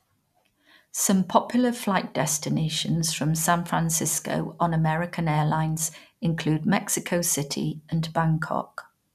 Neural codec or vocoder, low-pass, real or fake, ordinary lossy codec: none; 14.4 kHz; real; none